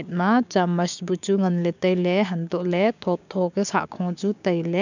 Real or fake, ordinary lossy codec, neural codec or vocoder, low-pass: fake; none; codec, 16 kHz, 6 kbps, DAC; 7.2 kHz